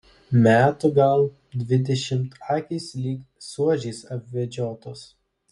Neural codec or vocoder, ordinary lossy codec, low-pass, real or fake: none; MP3, 48 kbps; 14.4 kHz; real